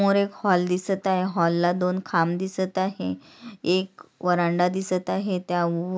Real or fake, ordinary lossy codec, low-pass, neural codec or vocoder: real; none; none; none